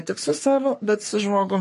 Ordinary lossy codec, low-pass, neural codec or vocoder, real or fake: MP3, 48 kbps; 14.4 kHz; codec, 44.1 kHz, 3.4 kbps, Pupu-Codec; fake